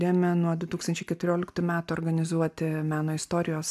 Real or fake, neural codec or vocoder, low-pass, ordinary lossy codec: real; none; 14.4 kHz; AAC, 96 kbps